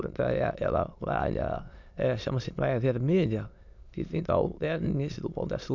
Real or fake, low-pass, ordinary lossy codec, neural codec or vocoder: fake; 7.2 kHz; none; autoencoder, 22.05 kHz, a latent of 192 numbers a frame, VITS, trained on many speakers